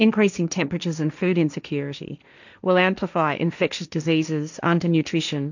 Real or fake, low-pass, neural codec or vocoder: fake; 7.2 kHz; codec, 16 kHz, 1.1 kbps, Voila-Tokenizer